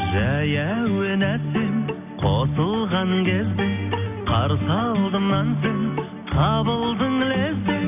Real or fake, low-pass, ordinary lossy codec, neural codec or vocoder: real; 3.6 kHz; none; none